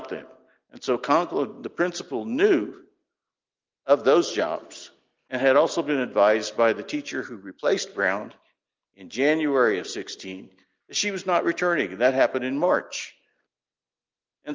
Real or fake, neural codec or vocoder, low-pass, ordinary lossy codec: real; none; 7.2 kHz; Opus, 24 kbps